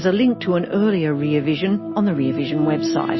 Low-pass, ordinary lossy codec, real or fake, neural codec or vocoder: 7.2 kHz; MP3, 24 kbps; real; none